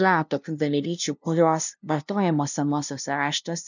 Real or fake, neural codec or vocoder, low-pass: fake; codec, 16 kHz, 0.5 kbps, FunCodec, trained on LibriTTS, 25 frames a second; 7.2 kHz